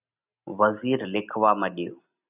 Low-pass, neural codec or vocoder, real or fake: 3.6 kHz; none; real